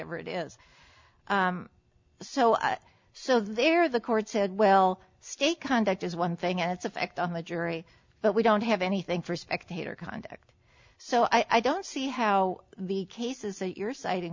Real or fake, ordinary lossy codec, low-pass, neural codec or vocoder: real; MP3, 64 kbps; 7.2 kHz; none